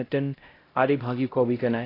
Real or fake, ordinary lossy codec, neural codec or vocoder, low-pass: fake; AAC, 24 kbps; codec, 16 kHz, 0.5 kbps, X-Codec, HuBERT features, trained on LibriSpeech; 5.4 kHz